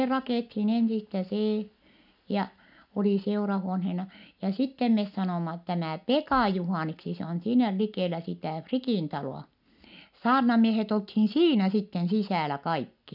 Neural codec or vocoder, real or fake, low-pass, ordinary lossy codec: none; real; 5.4 kHz; none